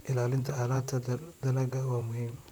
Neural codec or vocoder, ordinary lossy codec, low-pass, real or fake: vocoder, 44.1 kHz, 128 mel bands, Pupu-Vocoder; none; none; fake